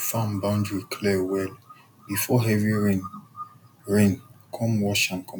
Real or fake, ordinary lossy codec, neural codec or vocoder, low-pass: real; none; none; 19.8 kHz